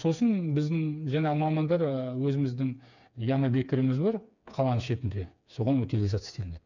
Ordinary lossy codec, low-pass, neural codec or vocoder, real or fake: none; 7.2 kHz; codec, 16 kHz, 4 kbps, FreqCodec, smaller model; fake